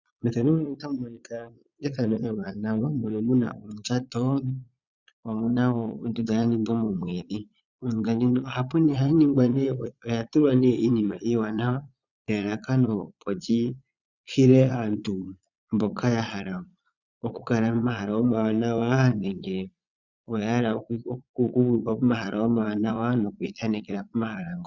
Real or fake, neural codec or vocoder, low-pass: fake; vocoder, 22.05 kHz, 80 mel bands, Vocos; 7.2 kHz